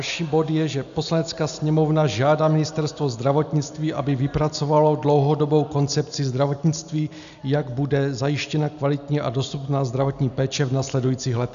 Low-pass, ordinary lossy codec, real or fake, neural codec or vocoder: 7.2 kHz; MP3, 96 kbps; real; none